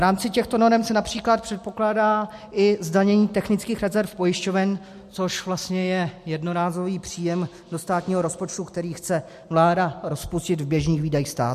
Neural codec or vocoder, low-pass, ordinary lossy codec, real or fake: none; 14.4 kHz; MP3, 64 kbps; real